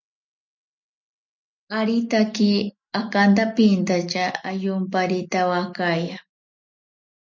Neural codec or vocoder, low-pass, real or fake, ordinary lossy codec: none; 7.2 kHz; real; MP3, 48 kbps